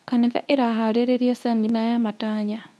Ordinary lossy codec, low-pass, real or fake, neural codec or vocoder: none; none; fake; codec, 24 kHz, 0.9 kbps, WavTokenizer, medium speech release version 2